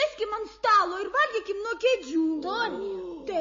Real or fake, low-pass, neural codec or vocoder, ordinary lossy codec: real; 7.2 kHz; none; MP3, 32 kbps